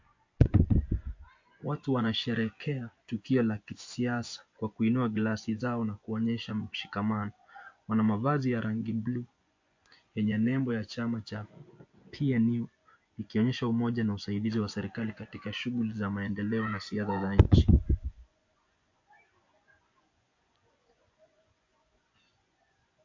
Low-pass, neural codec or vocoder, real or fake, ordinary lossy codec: 7.2 kHz; vocoder, 24 kHz, 100 mel bands, Vocos; fake; MP3, 48 kbps